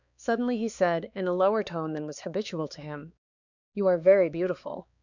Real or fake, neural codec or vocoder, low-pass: fake; codec, 16 kHz, 4 kbps, X-Codec, HuBERT features, trained on balanced general audio; 7.2 kHz